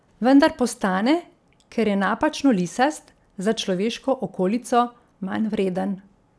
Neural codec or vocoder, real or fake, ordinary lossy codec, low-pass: none; real; none; none